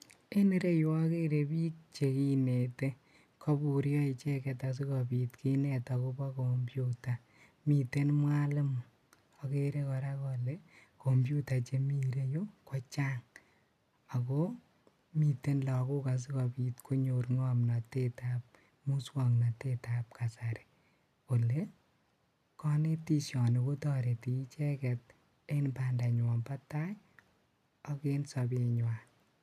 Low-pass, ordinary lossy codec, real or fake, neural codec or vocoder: 14.4 kHz; none; real; none